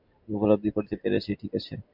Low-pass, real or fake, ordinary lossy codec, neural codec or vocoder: 5.4 kHz; real; MP3, 48 kbps; none